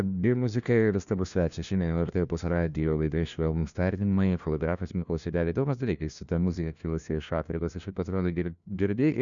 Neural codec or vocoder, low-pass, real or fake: codec, 16 kHz, 1 kbps, FunCodec, trained on LibriTTS, 50 frames a second; 7.2 kHz; fake